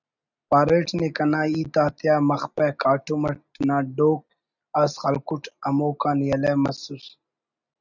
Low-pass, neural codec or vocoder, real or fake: 7.2 kHz; none; real